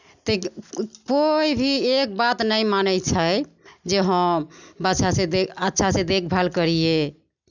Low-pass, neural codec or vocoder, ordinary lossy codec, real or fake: 7.2 kHz; none; none; real